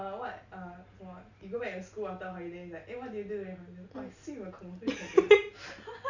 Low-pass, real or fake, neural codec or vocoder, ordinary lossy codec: 7.2 kHz; real; none; none